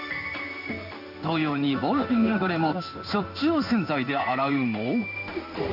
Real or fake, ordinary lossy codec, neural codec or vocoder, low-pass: fake; none; codec, 16 kHz in and 24 kHz out, 1 kbps, XY-Tokenizer; 5.4 kHz